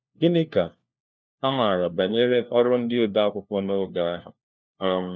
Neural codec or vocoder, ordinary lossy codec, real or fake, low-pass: codec, 16 kHz, 1 kbps, FunCodec, trained on LibriTTS, 50 frames a second; none; fake; none